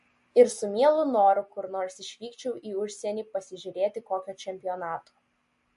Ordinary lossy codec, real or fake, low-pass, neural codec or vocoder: MP3, 48 kbps; real; 14.4 kHz; none